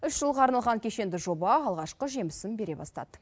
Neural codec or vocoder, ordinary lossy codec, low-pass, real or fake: none; none; none; real